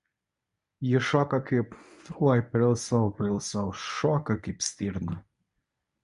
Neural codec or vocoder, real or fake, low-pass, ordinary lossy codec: codec, 24 kHz, 0.9 kbps, WavTokenizer, medium speech release version 1; fake; 10.8 kHz; MP3, 64 kbps